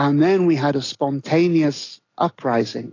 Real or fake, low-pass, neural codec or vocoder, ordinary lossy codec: real; 7.2 kHz; none; AAC, 32 kbps